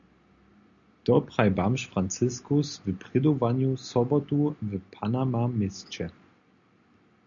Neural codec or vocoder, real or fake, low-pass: none; real; 7.2 kHz